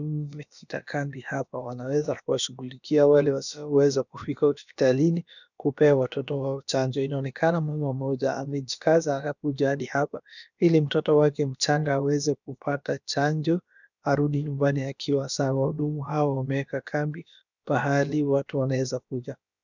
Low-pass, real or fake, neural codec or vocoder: 7.2 kHz; fake; codec, 16 kHz, about 1 kbps, DyCAST, with the encoder's durations